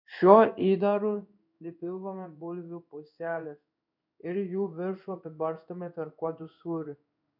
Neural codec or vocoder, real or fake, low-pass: codec, 16 kHz in and 24 kHz out, 1 kbps, XY-Tokenizer; fake; 5.4 kHz